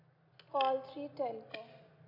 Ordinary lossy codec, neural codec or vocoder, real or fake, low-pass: AAC, 32 kbps; none; real; 5.4 kHz